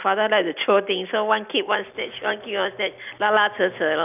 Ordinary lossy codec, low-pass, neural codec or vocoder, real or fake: none; 3.6 kHz; none; real